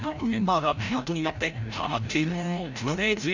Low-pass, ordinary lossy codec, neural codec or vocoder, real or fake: 7.2 kHz; none; codec, 16 kHz, 0.5 kbps, FreqCodec, larger model; fake